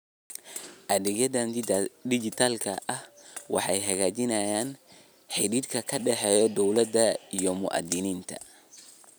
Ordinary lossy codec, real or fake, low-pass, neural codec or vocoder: none; real; none; none